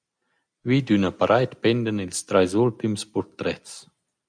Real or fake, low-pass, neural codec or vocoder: real; 9.9 kHz; none